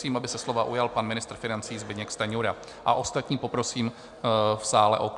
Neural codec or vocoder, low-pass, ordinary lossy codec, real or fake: none; 10.8 kHz; MP3, 96 kbps; real